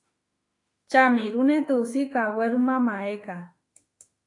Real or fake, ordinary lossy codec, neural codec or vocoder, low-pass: fake; AAC, 48 kbps; autoencoder, 48 kHz, 32 numbers a frame, DAC-VAE, trained on Japanese speech; 10.8 kHz